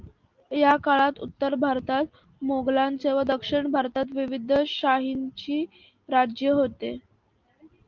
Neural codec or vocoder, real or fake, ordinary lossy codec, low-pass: none; real; Opus, 32 kbps; 7.2 kHz